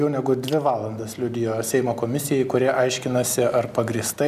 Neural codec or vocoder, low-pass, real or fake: none; 14.4 kHz; real